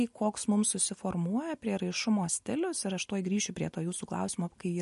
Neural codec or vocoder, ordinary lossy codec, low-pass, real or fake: none; MP3, 48 kbps; 14.4 kHz; real